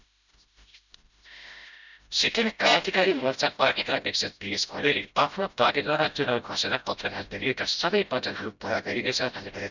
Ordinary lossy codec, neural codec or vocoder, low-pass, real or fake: none; codec, 16 kHz, 0.5 kbps, FreqCodec, smaller model; 7.2 kHz; fake